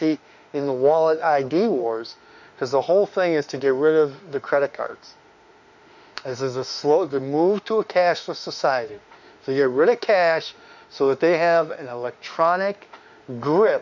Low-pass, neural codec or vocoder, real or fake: 7.2 kHz; autoencoder, 48 kHz, 32 numbers a frame, DAC-VAE, trained on Japanese speech; fake